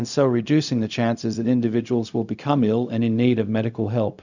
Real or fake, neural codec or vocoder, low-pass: fake; codec, 16 kHz, 0.4 kbps, LongCat-Audio-Codec; 7.2 kHz